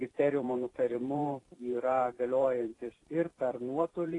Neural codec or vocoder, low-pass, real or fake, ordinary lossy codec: vocoder, 44.1 kHz, 128 mel bands every 512 samples, BigVGAN v2; 10.8 kHz; fake; AAC, 32 kbps